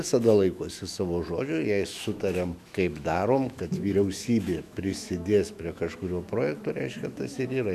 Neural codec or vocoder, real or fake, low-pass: autoencoder, 48 kHz, 128 numbers a frame, DAC-VAE, trained on Japanese speech; fake; 14.4 kHz